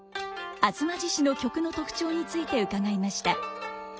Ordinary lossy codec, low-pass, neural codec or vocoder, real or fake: none; none; none; real